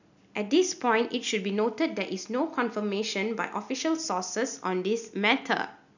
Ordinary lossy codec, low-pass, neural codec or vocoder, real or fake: none; 7.2 kHz; none; real